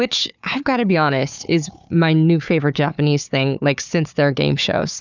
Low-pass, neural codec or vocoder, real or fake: 7.2 kHz; codec, 16 kHz, 4 kbps, FunCodec, trained on Chinese and English, 50 frames a second; fake